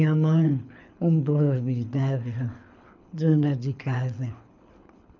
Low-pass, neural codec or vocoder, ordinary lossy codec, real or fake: 7.2 kHz; codec, 24 kHz, 6 kbps, HILCodec; none; fake